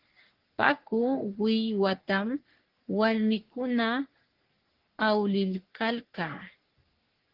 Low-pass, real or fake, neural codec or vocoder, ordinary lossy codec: 5.4 kHz; fake; codec, 44.1 kHz, 3.4 kbps, Pupu-Codec; Opus, 16 kbps